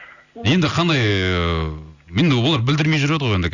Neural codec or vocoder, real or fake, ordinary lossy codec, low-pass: none; real; none; 7.2 kHz